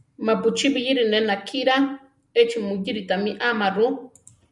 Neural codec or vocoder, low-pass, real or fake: none; 10.8 kHz; real